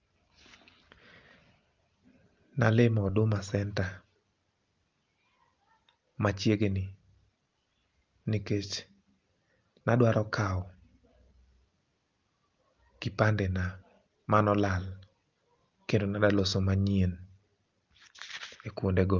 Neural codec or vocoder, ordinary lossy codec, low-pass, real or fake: none; Opus, 24 kbps; 7.2 kHz; real